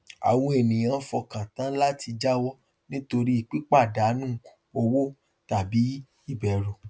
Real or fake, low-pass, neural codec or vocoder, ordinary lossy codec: real; none; none; none